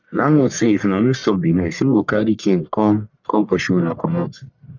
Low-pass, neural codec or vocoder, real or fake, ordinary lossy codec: 7.2 kHz; codec, 44.1 kHz, 1.7 kbps, Pupu-Codec; fake; none